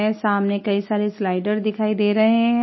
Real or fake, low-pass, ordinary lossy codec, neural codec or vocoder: real; 7.2 kHz; MP3, 24 kbps; none